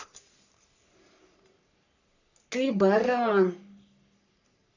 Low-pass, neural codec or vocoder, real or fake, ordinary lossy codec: 7.2 kHz; codec, 44.1 kHz, 3.4 kbps, Pupu-Codec; fake; none